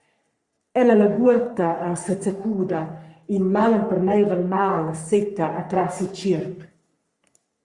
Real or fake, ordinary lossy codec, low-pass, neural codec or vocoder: fake; Opus, 64 kbps; 10.8 kHz; codec, 44.1 kHz, 3.4 kbps, Pupu-Codec